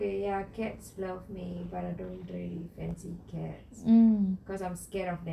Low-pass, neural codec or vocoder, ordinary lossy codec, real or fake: 14.4 kHz; none; none; real